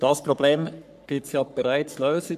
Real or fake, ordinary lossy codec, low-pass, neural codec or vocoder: fake; none; 14.4 kHz; codec, 44.1 kHz, 3.4 kbps, Pupu-Codec